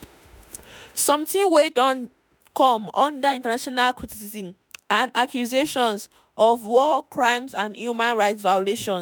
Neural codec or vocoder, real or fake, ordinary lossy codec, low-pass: autoencoder, 48 kHz, 32 numbers a frame, DAC-VAE, trained on Japanese speech; fake; none; none